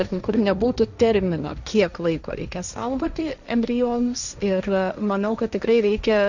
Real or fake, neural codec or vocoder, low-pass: fake; codec, 16 kHz, 1.1 kbps, Voila-Tokenizer; 7.2 kHz